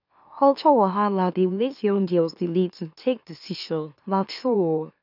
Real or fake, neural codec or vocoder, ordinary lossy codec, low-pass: fake; autoencoder, 44.1 kHz, a latent of 192 numbers a frame, MeloTTS; none; 5.4 kHz